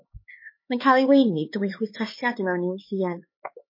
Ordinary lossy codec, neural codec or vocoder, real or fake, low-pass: MP3, 24 kbps; codec, 16 kHz, 4 kbps, X-Codec, HuBERT features, trained on LibriSpeech; fake; 5.4 kHz